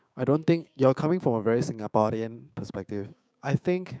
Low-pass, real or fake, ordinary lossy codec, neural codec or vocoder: none; fake; none; codec, 16 kHz, 6 kbps, DAC